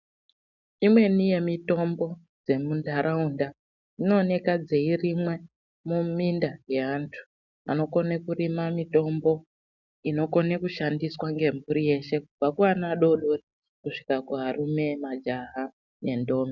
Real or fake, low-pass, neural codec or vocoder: real; 7.2 kHz; none